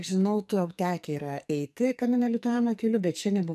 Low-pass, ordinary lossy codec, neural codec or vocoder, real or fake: 14.4 kHz; MP3, 96 kbps; codec, 44.1 kHz, 2.6 kbps, SNAC; fake